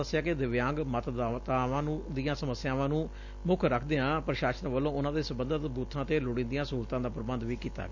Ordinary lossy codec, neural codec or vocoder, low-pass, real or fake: none; none; 7.2 kHz; real